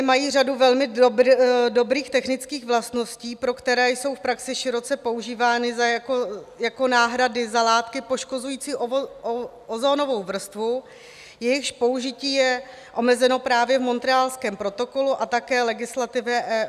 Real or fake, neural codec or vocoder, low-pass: real; none; 14.4 kHz